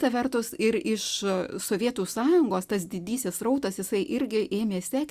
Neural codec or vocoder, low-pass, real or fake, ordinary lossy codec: none; 14.4 kHz; real; Opus, 64 kbps